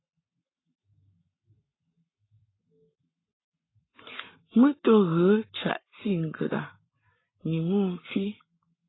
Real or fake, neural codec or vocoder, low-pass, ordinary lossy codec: fake; codec, 44.1 kHz, 7.8 kbps, Pupu-Codec; 7.2 kHz; AAC, 16 kbps